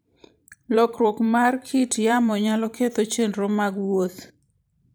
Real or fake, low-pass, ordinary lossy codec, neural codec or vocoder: fake; none; none; vocoder, 44.1 kHz, 128 mel bands every 512 samples, BigVGAN v2